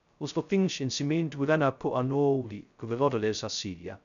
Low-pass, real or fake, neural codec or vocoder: 7.2 kHz; fake; codec, 16 kHz, 0.2 kbps, FocalCodec